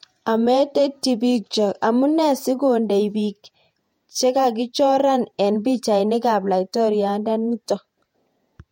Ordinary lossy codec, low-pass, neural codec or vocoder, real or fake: MP3, 64 kbps; 19.8 kHz; vocoder, 48 kHz, 128 mel bands, Vocos; fake